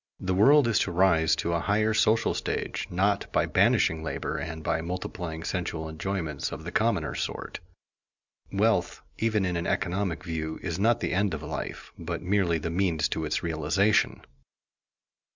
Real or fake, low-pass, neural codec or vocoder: real; 7.2 kHz; none